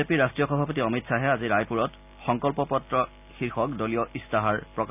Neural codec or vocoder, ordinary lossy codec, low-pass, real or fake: none; none; 3.6 kHz; real